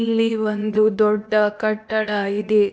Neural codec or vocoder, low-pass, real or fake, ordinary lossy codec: codec, 16 kHz, 0.8 kbps, ZipCodec; none; fake; none